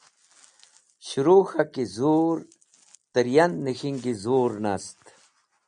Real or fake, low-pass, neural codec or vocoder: real; 9.9 kHz; none